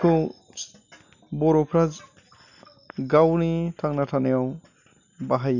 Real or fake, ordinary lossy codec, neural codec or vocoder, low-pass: real; none; none; 7.2 kHz